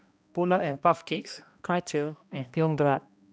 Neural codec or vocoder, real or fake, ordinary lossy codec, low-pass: codec, 16 kHz, 1 kbps, X-Codec, HuBERT features, trained on balanced general audio; fake; none; none